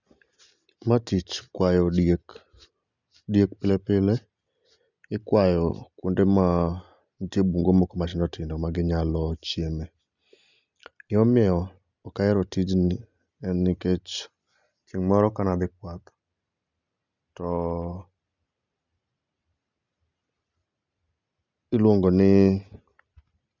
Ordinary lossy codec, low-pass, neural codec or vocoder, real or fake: none; 7.2 kHz; none; real